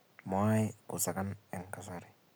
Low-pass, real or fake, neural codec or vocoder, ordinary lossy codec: none; real; none; none